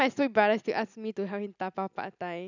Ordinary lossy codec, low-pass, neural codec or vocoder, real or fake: none; 7.2 kHz; none; real